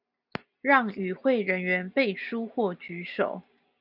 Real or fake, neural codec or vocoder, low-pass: real; none; 5.4 kHz